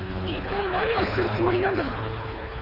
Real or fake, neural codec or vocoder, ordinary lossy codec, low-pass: fake; codec, 24 kHz, 3 kbps, HILCodec; none; 5.4 kHz